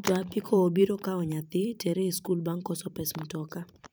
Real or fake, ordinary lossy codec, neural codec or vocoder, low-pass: fake; none; vocoder, 44.1 kHz, 128 mel bands every 512 samples, BigVGAN v2; none